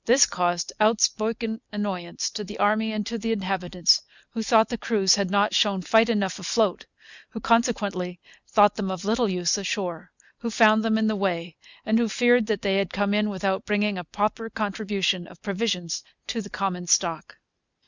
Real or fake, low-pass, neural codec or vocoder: real; 7.2 kHz; none